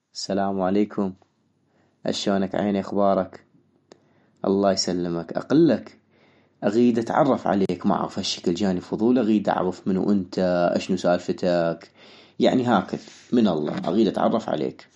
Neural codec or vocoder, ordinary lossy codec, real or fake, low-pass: none; MP3, 48 kbps; real; 19.8 kHz